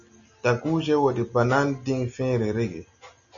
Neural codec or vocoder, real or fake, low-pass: none; real; 7.2 kHz